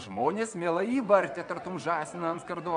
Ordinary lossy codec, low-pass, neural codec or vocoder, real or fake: MP3, 64 kbps; 9.9 kHz; vocoder, 22.05 kHz, 80 mel bands, WaveNeXt; fake